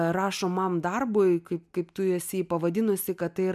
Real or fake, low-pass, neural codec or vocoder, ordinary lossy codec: real; 14.4 kHz; none; MP3, 96 kbps